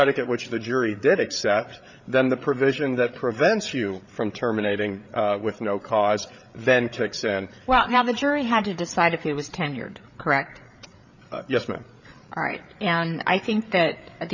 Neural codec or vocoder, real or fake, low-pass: codec, 16 kHz, 8 kbps, FreqCodec, larger model; fake; 7.2 kHz